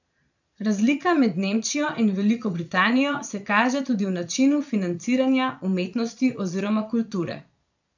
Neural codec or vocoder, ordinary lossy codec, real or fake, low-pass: vocoder, 22.05 kHz, 80 mel bands, Vocos; none; fake; 7.2 kHz